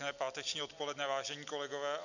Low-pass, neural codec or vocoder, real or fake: 7.2 kHz; none; real